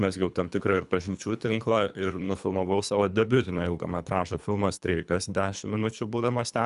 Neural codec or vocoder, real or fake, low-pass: codec, 24 kHz, 3 kbps, HILCodec; fake; 10.8 kHz